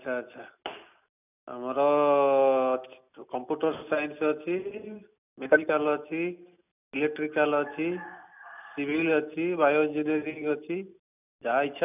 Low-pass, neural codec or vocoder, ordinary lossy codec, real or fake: 3.6 kHz; none; none; real